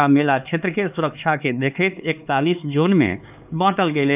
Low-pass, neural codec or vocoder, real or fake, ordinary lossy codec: 3.6 kHz; codec, 16 kHz, 4 kbps, X-Codec, HuBERT features, trained on LibriSpeech; fake; none